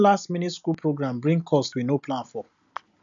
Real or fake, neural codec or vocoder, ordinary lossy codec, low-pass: real; none; none; 7.2 kHz